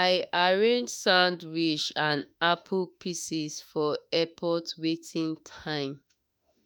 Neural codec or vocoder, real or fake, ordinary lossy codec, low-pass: autoencoder, 48 kHz, 32 numbers a frame, DAC-VAE, trained on Japanese speech; fake; none; none